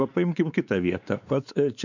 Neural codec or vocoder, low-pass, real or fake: codec, 16 kHz, 4 kbps, X-Codec, WavLM features, trained on Multilingual LibriSpeech; 7.2 kHz; fake